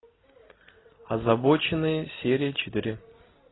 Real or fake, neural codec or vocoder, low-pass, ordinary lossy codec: real; none; 7.2 kHz; AAC, 16 kbps